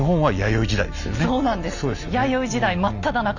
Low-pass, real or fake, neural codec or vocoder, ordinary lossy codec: 7.2 kHz; real; none; none